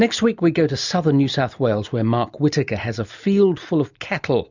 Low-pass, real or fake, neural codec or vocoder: 7.2 kHz; real; none